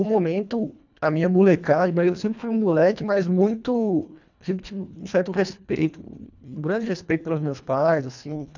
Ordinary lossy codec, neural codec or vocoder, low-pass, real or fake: none; codec, 24 kHz, 1.5 kbps, HILCodec; 7.2 kHz; fake